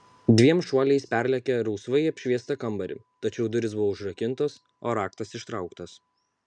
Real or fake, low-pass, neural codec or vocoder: real; 9.9 kHz; none